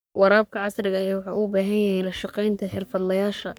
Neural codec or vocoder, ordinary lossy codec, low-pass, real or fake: codec, 44.1 kHz, 3.4 kbps, Pupu-Codec; none; none; fake